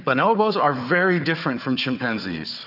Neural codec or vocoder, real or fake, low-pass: codec, 16 kHz, 4 kbps, FunCodec, trained on Chinese and English, 50 frames a second; fake; 5.4 kHz